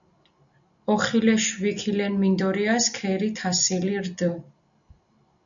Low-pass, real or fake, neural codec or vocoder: 7.2 kHz; real; none